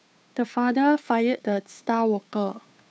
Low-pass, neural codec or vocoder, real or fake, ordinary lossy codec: none; codec, 16 kHz, 2 kbps, FunCodec, trained on Chinese and English, 25 frames a second; fake; none